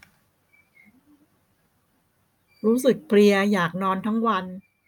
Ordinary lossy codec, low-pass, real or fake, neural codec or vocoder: none; 19.8 kHz; real; none